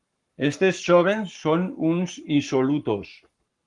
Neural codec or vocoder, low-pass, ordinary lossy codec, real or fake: codec, 44.1 kHz, 7.8 kbps, Pupu-Codec; 10.8 kHz; Opus, 24 kbps; fake